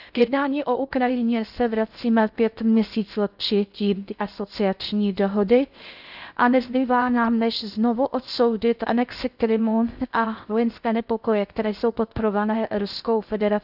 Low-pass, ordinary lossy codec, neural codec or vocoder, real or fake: 5.4 kHz; none; codec, 16 kHz in and 24 kHz out, 0.6 kbps, FocalCodec, streaming, 2048 codes; fake